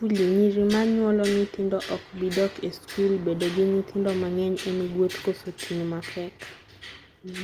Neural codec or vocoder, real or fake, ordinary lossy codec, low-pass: none; real; Opus, 24 kbps; 14.4 kHz